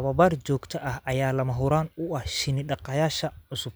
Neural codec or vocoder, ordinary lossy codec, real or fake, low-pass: none; none; real; none